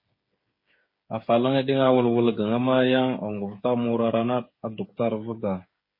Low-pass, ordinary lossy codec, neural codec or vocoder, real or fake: 5.4 kHz; MP3, 24 kbps; codec, 16 kHz, 8 kbps, FreqCodec, smaller model; fake